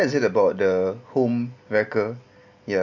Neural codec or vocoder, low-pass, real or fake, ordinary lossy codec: none; 7.2 kHz; real; none